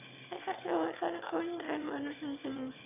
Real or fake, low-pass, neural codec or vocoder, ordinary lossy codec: fake; 3.6 kHz; autoencoder, 22.05 kHz, a latent of 192 numbers a frame, VITS, trained on one speaker; none